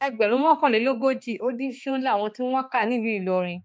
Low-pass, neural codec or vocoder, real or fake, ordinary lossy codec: none; codec, 16 kHz, 2 kbps, X-Codec, HuBERT features, trained on balanced general audio; fake; none